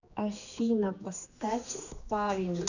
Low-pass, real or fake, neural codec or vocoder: 7.2 kHz; fake; codec, 16 kHz, 2 kbps, X-Codec, HuBERT features, trained on general audio